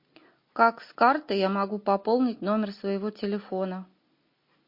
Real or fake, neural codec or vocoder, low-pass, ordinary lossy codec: real; none; 5.4 kHz; MP3, 32 kbps